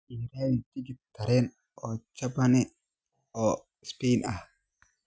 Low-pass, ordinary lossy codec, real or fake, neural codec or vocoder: none; none; real; none